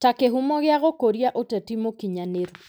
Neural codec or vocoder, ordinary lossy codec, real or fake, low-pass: none; none; real; none